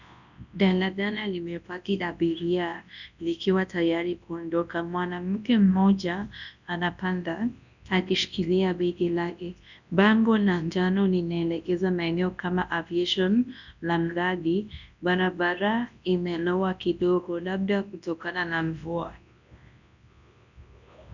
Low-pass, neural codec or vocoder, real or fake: 7.2 kHz; codec, 24 kHz, 0.9 kbps, WavTokenizer, large speech release; fake